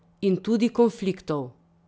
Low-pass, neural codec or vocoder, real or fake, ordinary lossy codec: none; none; real; none